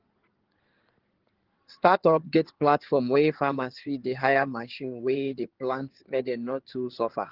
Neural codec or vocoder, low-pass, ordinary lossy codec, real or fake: codec, 16 kHz in and 24 kHz out, 2.2 kbps, FireRedTTS-2 codec; 5.4 kHz; Opus, 16 kbps; fake